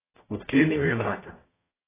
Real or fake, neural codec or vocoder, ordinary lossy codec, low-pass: fake; codec, 44.1 kHz, 0.9 kbps, DAC; AAC, 16 kbps; 3.6 kHz